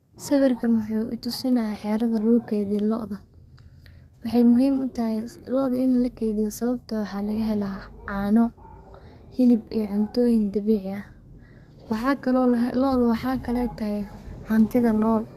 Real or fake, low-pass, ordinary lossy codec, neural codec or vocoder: fake; 14.4 kHz; Opus, 64 kbps; codec, 32 kHz, 1.9 kbps, SNAC